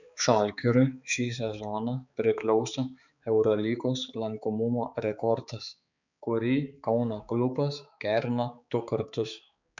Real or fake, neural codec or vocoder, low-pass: fake; codec, 16 kHz, 4 kbps, X-Codec, HuBERT features, trained on balanced general audio; 7.2 kHz